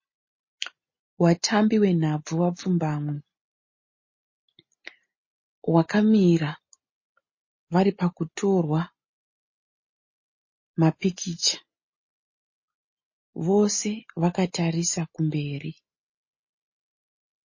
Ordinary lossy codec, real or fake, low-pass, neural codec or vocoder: MP3, 32 kbps; real; 7.2 kHz; none